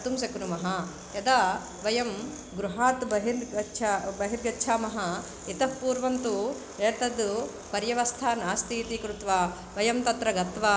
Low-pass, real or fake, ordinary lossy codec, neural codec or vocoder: none; real; none; none